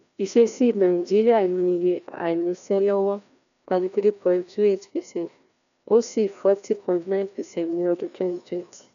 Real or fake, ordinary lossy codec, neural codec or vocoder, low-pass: fake; none; codec, 16 kHz, 1 kbps, FreqCodec, larger model; 7.2 kHz